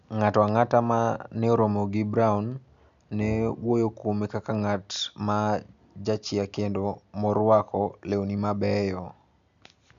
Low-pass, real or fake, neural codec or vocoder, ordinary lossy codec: 7.2 kHz; real; none; none